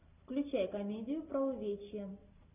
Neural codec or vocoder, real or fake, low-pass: none; real; 3.6 kHz